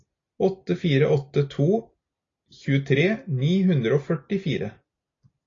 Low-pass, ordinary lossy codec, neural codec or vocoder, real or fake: 7.2 kHz; AAC, 32 kbps; none; real